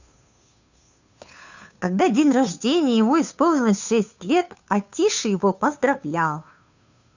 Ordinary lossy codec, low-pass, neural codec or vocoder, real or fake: none; 7.2 kHz; codec, 16 kHz, 2 kbps, FunCodec, trained on Chinese and English, 25 frames a second; fake